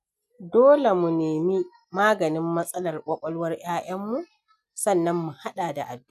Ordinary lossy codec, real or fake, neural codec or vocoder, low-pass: none; real; none; 14.4 kHz